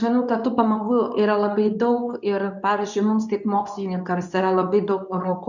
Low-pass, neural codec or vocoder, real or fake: 7.2 kHz; codec, 24 kHz, 0.9 kbps, WavTokenizer, medium speech release version 2; fake